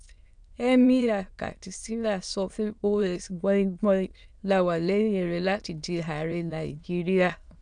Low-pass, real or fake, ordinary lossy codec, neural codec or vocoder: 9.9 kHz; fake; none; autoencoder, 22.05 kHz, a latent of 192 numbers a frame, VITS, trained on many speakers